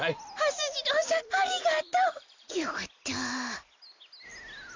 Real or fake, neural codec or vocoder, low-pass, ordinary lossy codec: real; none; 7.2 kHz; MP3, 64 kbps